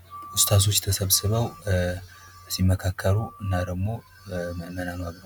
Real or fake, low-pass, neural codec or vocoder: real; 19.8 kHz; none